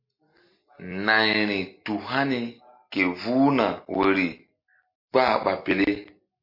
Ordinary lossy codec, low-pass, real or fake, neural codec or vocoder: MP3, 32 kbps; 5.4 kHz; real; none